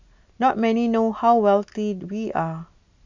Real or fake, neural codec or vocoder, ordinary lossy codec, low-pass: real; none; MP3, 64 kbps; 7.2 kHz